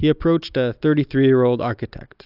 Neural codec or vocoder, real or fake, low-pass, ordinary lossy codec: none; real; 5.4 kHz; AAC, 48 kbps